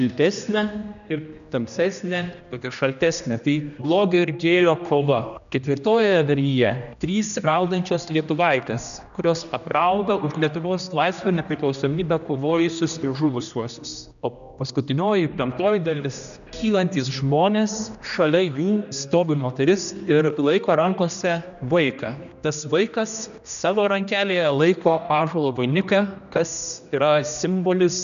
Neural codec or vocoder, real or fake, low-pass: codec, 16 kHz, 1 kbps, X-Codec, HuBERT features, trained on general audio; fake; 7.2 kHz